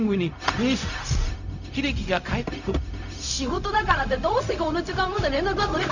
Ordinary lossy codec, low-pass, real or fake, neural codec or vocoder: none; 7.2 kHz; fake; codec, 16 kHz, 0.4 kbps, LongCat-Audio-Codec